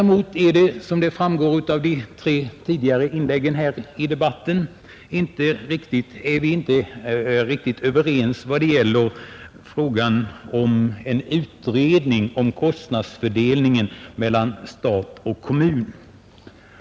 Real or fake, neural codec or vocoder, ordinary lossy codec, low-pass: real; none; none; none